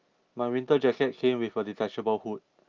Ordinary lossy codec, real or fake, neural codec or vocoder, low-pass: Opus, 24 kbps; real; none; 7.2 kHz